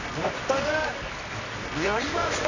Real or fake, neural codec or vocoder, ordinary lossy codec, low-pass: fake; codec, 24 kHz, 0.9 kbps, WavTokenizer, medium music audio release; none; 7.2 kHz